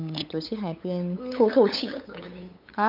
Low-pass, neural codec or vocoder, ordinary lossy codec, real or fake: 5.4 kHz; codec, 16 kHz, 8 kbps, FunCodec, trained on LibriTTS, 25 frames a second; none; fake